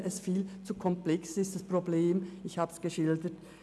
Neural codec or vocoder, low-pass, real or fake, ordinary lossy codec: none; none; real; none